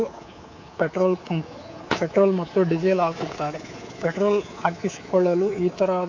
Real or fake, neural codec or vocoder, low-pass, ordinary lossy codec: fake; codec, 24 kHz, 3.1 kbps, DualCodec; 7.2 kHz; AAC, 48 kbps